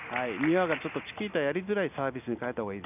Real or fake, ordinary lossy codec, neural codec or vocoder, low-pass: real; none; none; 3.6 kHz